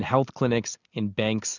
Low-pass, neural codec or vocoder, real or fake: 7.2 kHz; none; real